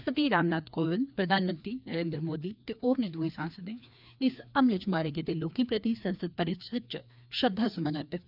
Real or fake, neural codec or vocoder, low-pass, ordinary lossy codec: fake; codec, 16 kHz, 2 kbps, FreqCodec, larger model; 5.4 kHz; none